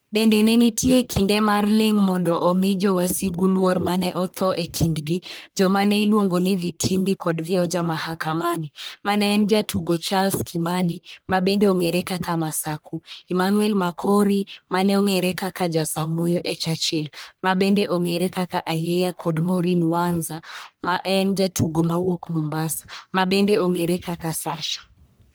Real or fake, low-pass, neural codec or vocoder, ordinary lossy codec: fake; none; codec, 44.1 kHz, 1.7 kbps, Pupu-Codec; none